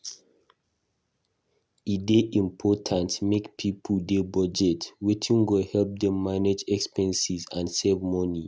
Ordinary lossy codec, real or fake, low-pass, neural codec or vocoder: none; real; none; none